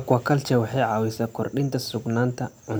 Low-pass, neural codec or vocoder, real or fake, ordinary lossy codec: none; none; real; none